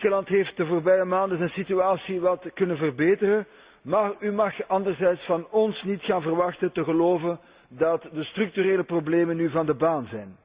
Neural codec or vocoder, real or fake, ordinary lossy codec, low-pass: none; real; Opus, 64 kbps; 3.6 kHz